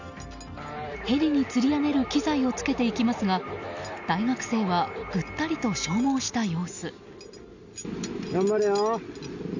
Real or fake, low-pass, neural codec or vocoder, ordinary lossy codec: real; 7.2 kHz; none; none